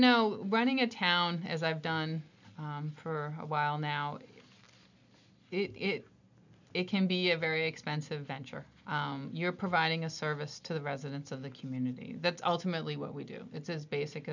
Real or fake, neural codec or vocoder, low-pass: real; none; 7.2 kHz